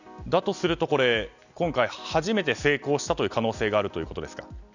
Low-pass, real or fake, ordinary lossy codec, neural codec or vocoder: 7.2 kHz; real; none; none